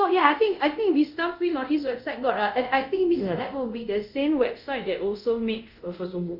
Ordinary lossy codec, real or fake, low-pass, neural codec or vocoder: none; fake; 5.4 kHz; codec, 24 kHz, 0.5 kbps, DualCodec